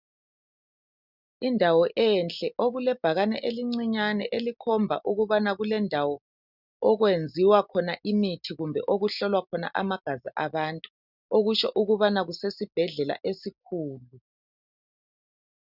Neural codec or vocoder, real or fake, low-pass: none; real; 5.4 kHz